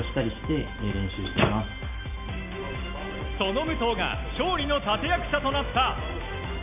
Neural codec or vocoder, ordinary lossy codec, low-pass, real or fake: none; AAC, 32 kbps; 3.6 kHz; real